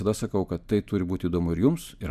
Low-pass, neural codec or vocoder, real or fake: 14.4 kHz; none; real